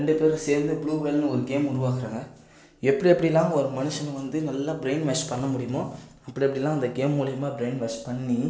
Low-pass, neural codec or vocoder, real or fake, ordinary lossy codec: none; none; real; none